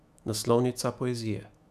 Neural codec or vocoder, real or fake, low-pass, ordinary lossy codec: autoencoder, 48 kHz, 128 numbers a frame, DAC-VAE, trained on Japanese speech; fake; 14.4 kHz; none